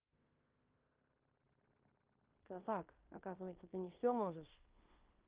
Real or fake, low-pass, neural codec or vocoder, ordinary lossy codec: fake; 3.6 kHz; codec, 16 kHz in and 24 kHz out, 0.9 kbps, LongCat-Audio-Codec, fine tuned four codebook decoder; Opus, 32 kbps